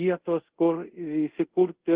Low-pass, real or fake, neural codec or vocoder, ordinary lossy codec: 3.6 kHz; fake; codec, 24 kHz, 0.5 kbps, DualCodec; Opus, 16 kbps